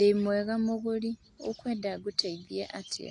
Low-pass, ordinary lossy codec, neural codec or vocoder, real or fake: 10.8 kHz; AAC, 48 kbps; none; real